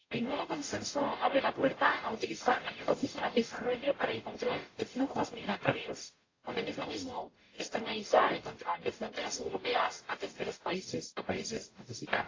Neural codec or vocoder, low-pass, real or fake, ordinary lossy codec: codec, 44.1 kHz, 0.9 kbps, DAC; 7.2 kHz; fake; AAC, 32 kbps